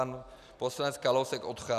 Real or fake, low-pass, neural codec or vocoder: real; 14.4 kHz; none